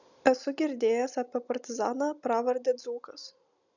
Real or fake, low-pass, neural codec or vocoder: real; 7.2 kHz; none